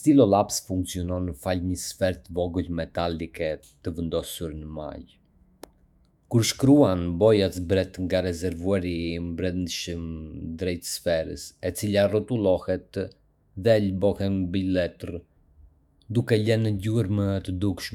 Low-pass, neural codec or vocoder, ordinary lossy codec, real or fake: 19.8 kHz; autoencoder, 48 kHz, 128 numbers a frame, DAC-VAE, trained on Japanese speech; none; fake